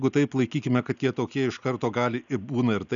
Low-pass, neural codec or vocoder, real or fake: 7.2 kHz; none; real